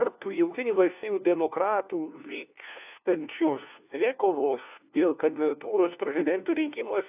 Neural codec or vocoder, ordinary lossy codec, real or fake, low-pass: codec, 16 kHz, 1 kbps, FunCodec, trained on LibriTTS, 50 frames a second; AAC, 32 kbps; fake; 3.6 kHz